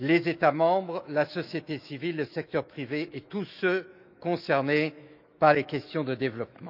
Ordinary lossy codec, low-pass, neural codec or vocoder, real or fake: none; 5.4 kHz; autoencoder, 48 kHz, 128 numbers a frame, DAC-VAE, trained on Japanese speech; fake